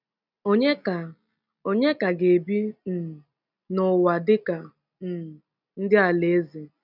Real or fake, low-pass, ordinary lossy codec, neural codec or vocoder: real; 5.4 kHz; none; none